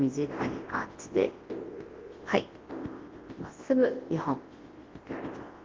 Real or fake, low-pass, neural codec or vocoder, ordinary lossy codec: fake; 7.2 kHz; codec, 24 kHz, 0.9 kbps, WavTokenizer, large speech release; Opus, 16 kbps